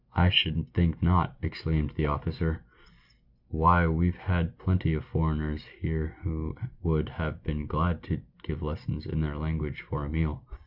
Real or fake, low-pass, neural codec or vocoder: real; 5.4 kHz; none